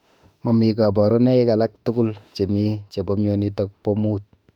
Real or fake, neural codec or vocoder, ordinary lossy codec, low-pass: fake; autoencoder, 48 kHz, 32 numbers a frame, DAC-VAE, trained on Japanese speech; none; 19.8 kHz